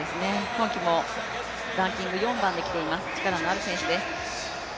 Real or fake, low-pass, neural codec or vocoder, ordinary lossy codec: real; none; none; none